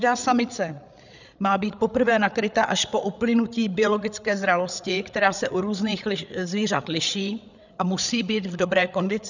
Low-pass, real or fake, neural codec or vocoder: 7.2 kHz; fake; codec, 16 kHz, 8 kbps, FreqCodec, larger model